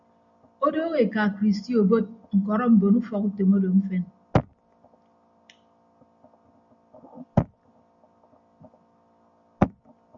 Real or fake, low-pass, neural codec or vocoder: real; 7.2 kHz; none